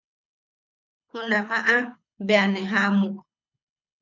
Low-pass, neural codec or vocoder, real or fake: 7.2 kHz; codec, 24 kHz, 6 kbps, HILCodec; fake